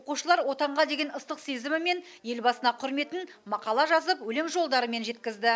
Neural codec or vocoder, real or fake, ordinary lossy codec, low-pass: none; real; none; none